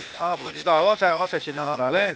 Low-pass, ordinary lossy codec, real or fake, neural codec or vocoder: none; none; fake; codec, 16 kHz, 0.8 kbps, ZipCodec